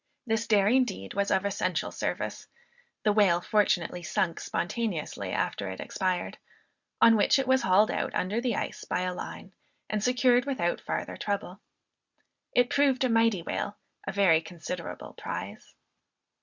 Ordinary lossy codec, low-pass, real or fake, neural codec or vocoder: Opus, 64 kbps; 7.2 kHz; real; none